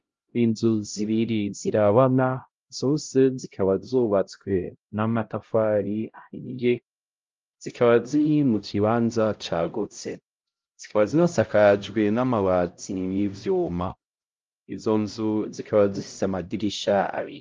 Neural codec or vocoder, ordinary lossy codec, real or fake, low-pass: codec, 16 kHz, 0.5 kbps, X-Codec, HuBERT features, trained on LibriSpeech; Opus, 32 kbps; fake; 7.2 kHz